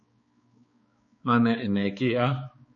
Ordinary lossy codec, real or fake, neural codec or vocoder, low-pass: MP3, 32 kbps; fake; codec, 16 kHz, 4 kbps, X-Codec, HuBERT features, trained on balanced general audio; 7.2 kHz